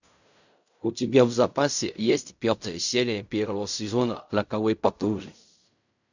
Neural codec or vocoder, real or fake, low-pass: codec, 16 kHz in and 24 kHz out, 0.4 kbps, LongCat-Audio-Codec, fine tuned four codebook decoder; fake; 7.2 kHz